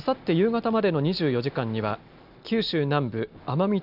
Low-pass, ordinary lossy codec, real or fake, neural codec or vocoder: 5.4 kHz; none; real; none